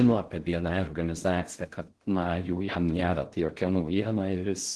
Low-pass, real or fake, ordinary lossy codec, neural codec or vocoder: 10.8 kHz; fake; Opus, 16 kbps; codec, 16 kHz in and 24 kHz out, 0.6 kbps, FocalCodec, streaming, 4096 codes